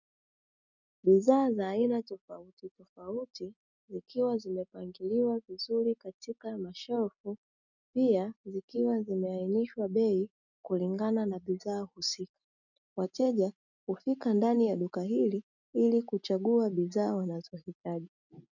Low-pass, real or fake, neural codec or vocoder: 7.2 kHz; real; none